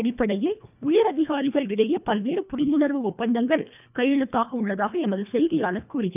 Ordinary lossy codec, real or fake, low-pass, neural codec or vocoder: none; fake; 3.6 kHz; codec, 24 kHz, 1.5 kbps, HILCodec